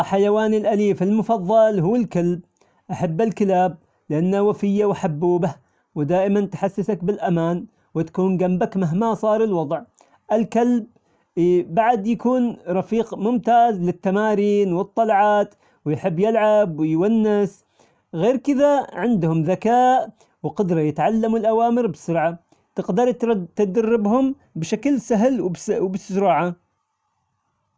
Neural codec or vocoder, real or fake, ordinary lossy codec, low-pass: none; real; none; none